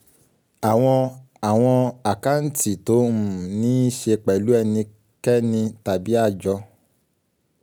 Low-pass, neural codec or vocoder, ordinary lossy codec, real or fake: none; none; none; real